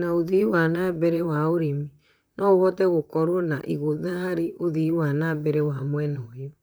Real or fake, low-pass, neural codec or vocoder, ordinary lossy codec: fake; none; vocoder, 44.1 kHz, 128 mel bands, Pupu-Vocoder; none